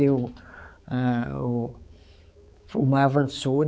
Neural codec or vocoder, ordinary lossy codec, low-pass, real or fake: codec, 16 kHz, 4 kbps, X-Codec, HuBERT features, trained on balanced general audio; none; none; fake